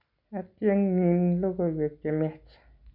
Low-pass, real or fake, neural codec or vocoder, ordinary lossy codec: 5.4 kHz; real; none; none